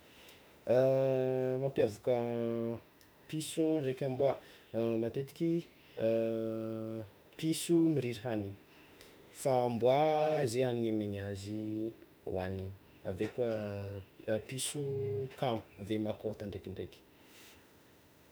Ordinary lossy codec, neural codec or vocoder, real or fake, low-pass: none; autoencoder, 48 kHz, 32 numbers a frame, DAC-VAE, trained on Japanese speech; fake; none